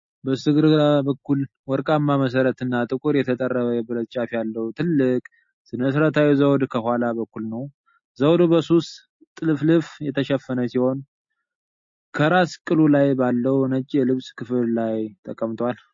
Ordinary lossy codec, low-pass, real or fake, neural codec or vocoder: MP3, 32 kbps; 7.2 kHz; real; none